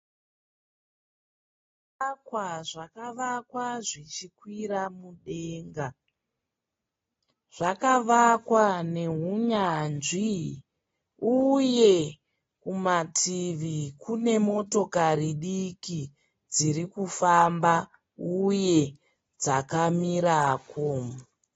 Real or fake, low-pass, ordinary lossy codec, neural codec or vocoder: real; 7.2 kHz; AAC, 24 kbps; none